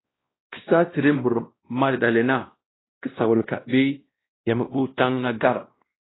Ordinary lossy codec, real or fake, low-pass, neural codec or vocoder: AAC, 16 kbps; fake; 7.2 kHz; codec, 16 kHz, 1 kbps, X-Codec, WavLM features, trained on Multilingual LibriSpeech